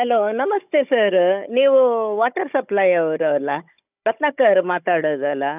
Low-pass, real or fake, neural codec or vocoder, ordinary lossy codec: 3.6 kHz; fake; codec, 16 kHz, 16 kbps, FunCodec, trained on Chinese and English, 50 frames a second; none